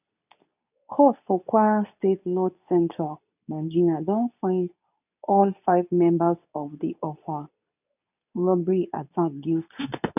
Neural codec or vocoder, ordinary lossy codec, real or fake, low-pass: codec, 24 kHz, 0.9 kbps, WavTokenizer, medium speech release version 2; none; fake; 3.6 kHz